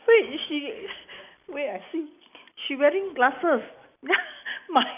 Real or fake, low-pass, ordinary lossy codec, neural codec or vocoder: real; 3.6 kHz; none; none